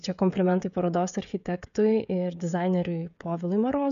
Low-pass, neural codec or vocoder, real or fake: 7.2 kHz; codec, 16 kHz, 16 kbps, FreqCodec, smaller model; fake